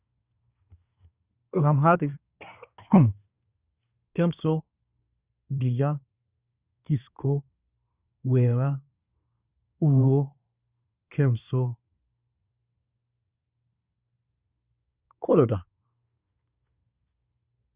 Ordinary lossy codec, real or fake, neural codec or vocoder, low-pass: Opus, 64 kbps; fake; codec, 24 kHz, 1 kbps, SNAC; 3.6 kHz